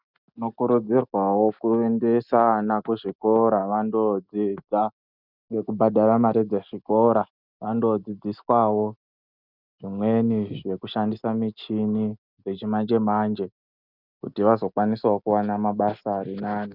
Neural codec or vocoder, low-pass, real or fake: none; 5.4 kHz; real